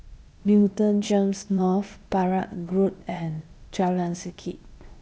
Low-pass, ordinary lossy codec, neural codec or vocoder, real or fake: none; none; codec, 16 kHz, 0.8 kbps, ZipCodec; fake